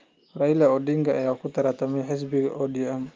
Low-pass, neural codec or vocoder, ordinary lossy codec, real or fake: 7.2 kHz; none; Opus, 24 kbps; real